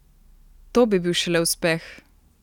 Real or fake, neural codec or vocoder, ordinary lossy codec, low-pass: real; none; none; 19.8 kHz